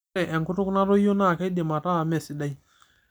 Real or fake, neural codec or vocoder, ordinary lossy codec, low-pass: real; none; none; none